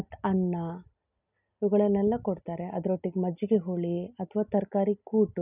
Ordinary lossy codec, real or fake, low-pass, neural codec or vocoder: none; real; 3.6 kHz; none